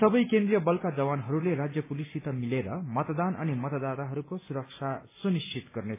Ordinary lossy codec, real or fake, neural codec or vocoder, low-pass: MP3, 16 kbps; real; none; 3.6 kHz